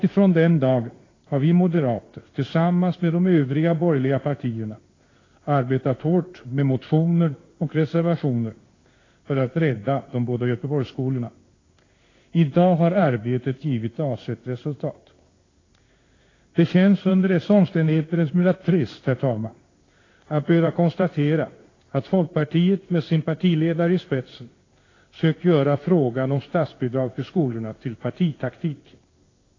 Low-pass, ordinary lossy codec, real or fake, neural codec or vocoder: 7.2 kHz; AAC, 32 kbps; fake; codec, 16 kHz in and 24 kHz out, 1 kbps, XY-Tokenizer